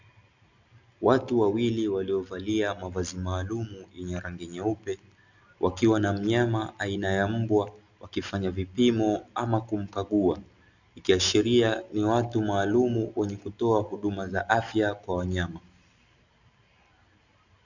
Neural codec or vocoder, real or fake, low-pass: none; real; 7.2 kHz